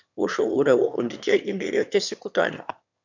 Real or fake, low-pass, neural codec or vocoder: fake; 7.2 kHz; autoencoder, 22.05 kHz, a latent of 192 numbers a frame, VITS, trained on one speaker